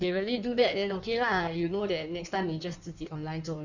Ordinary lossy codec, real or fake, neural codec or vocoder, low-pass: none; fake; codec, 16 kHz in and 24 kHz out, 1.1 kbps, FireRedTTS-2 codec; 7.2 kHz